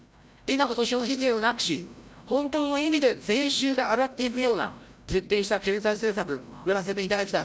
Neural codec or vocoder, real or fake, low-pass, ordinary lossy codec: codec, 16 kHz, 0.5 kbps, FreqCodec, larger model; fake; none; none